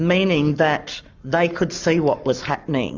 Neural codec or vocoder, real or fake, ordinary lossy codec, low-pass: codec, 44.1 kHz, 7.8 kbps, Pupu-Codec; fake; Opus, 32 kbps; 7.2 kHz